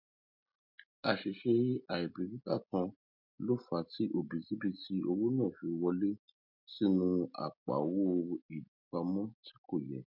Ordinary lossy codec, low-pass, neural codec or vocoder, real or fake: MP3, 48 kbps; 5.4 kHz; none; real